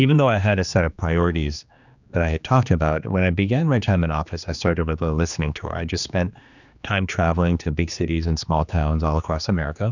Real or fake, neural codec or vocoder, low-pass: fake; codec, 16 kHz, 2 kbps, X-Codec, HuBERT features, trained on general audio; 7.2 kHz